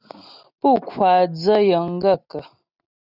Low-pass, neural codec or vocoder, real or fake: 5.4 kHz; none; real